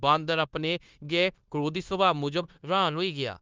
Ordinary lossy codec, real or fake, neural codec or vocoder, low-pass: Opus, 24 kbps; fake; codec, 16 kHz, 0.9 kbps, LongCat-Audio-Codec; 7.2 kHz